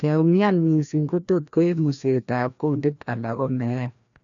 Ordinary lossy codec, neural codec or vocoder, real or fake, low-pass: none; codec, 16 kHz, 1 kbps, FreqCodec, larger model; fake; 7.2 kHz